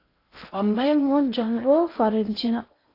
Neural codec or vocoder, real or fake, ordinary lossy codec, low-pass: codec, 16 kHz in and 24 kHz out, 0.6 kbps, FocalCodec, streaming, 4096 codes; fake; AAC, 32 kbps; 5.4 kHz